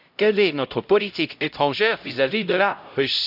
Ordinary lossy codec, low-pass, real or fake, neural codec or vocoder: none; 5.4 kHz; fake; codec, 16 kHz, 0.5 kbps, X-Codec, HuBERT features, trained on LibriSpeech